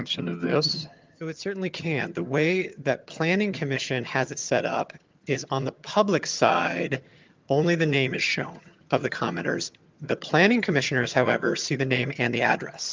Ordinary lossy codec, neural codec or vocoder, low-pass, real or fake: Opus, 24 kbps; vocoder, 22.05 kHz, 80 mel bands, HiFi-GAN; 7.2 kHz; fake